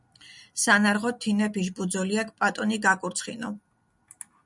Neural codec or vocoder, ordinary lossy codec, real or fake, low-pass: none; MP3, 96 kbps; real; 10.8 kHz